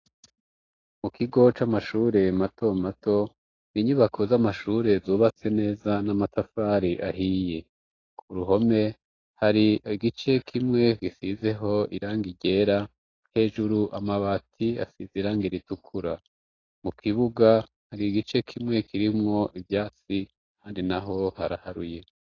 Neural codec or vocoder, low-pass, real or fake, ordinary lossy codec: none; 7.2 kHz; real; AAC, 32 kbps